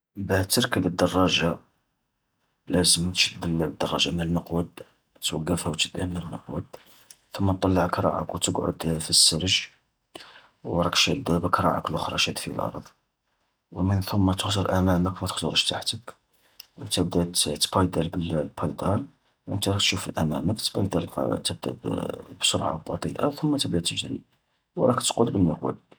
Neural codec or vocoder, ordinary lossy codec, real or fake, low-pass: none; none; real; none